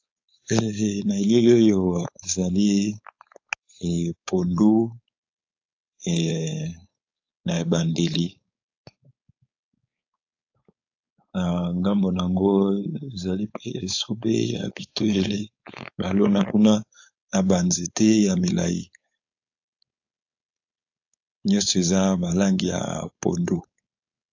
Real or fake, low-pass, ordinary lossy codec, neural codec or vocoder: fake; 7.2 kHz; AAC, 48 kbps; codec, 16 kHz, 4.8 kbps, FACodec